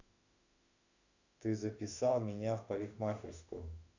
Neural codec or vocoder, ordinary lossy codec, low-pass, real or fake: autoencoder, 48 kHz, 32 numbers a frame, DAC-VAE, trained on Japanese speech; none; 7.2 kHz; fake